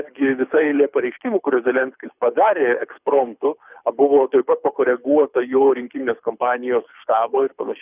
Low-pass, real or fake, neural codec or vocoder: 3.6 kHz; fake; codec, 24 kHz, 6 kbps, HILCodec